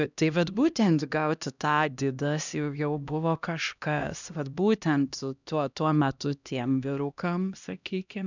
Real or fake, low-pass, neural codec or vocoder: fake; 7.2 kHz; codec, 16 kHz, 1 kbps, X-Codec, HuBERT features, trained on LibriSpeech